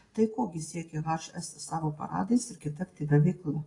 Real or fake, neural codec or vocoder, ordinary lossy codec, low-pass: real; none; AAC, 32 kbps; 10.8 kHz